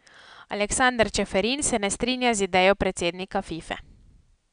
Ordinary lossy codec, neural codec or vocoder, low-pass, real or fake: none; none; 9.9 kHz; real